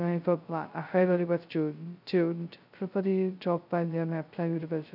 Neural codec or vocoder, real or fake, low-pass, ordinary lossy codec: codec, 16 kHz, 0.2 kbps, FocalCodec; fake; 5.4 kHz; none